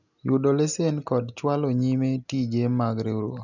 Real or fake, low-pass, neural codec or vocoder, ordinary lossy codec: real; 7.2 kHz; none; none